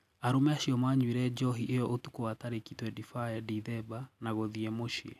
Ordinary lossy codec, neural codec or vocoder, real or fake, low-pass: none; none; real; 14.4 kHz